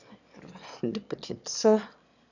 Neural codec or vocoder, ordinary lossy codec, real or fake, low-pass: autoencoder, 22.05 kHz, a latent of 192 numbers a frame, VITS, trained on one speaker; none; fake; 7.2 kHz